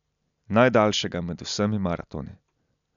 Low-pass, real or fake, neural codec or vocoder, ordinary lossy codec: 7.2 kHz; real; none; none